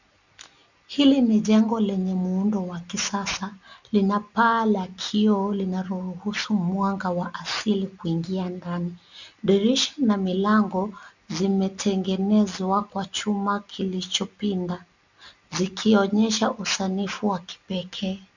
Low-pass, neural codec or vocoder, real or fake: 7.2 kHz; none; real